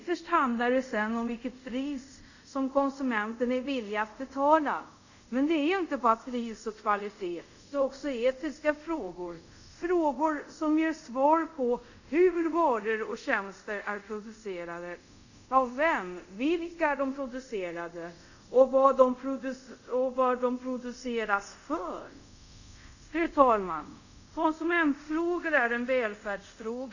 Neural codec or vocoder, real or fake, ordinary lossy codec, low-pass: codec, 24 kHz, 0.5 kbps, DualCodec; fake; none; 7.2 kHz